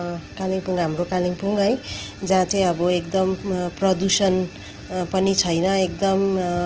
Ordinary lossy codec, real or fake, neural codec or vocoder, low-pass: Opus, 16 kbps; real; none; 7.2 kHz